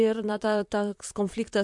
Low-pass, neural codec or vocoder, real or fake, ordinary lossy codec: 10.8 kHz; vocoder, 44.1 kHz, 128 mel bands, Pupu-Vocoder; fake; MP3, 64 kbps